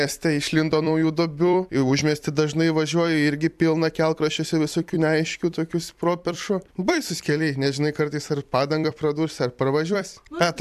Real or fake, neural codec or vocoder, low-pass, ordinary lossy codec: fake; vocoder, 44.1 kHz, 128 mel bands every 256 samples, BigVGAN v2; 14.4 kHz; AAC, 96 kbps